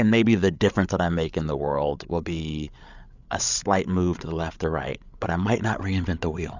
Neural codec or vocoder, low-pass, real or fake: codec, 16 kHz, 8 kbps, FreqCodec, larger model; 7.2 kHz; fake